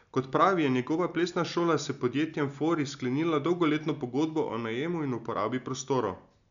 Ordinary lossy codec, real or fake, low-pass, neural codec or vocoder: none; real; 7.2 kHz; none